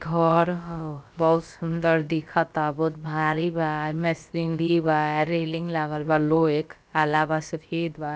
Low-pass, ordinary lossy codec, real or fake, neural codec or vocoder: none; none; fake; codec, 16 kHz, about 1 kbps, DyCAST, with the encoder's durations